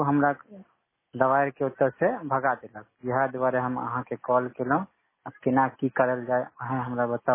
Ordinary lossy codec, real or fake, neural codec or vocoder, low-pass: MP3, 16 kbps; real; none; 3.6 kHz